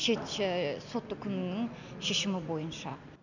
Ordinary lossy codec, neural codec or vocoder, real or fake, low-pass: none; none; real; 7.2 kHz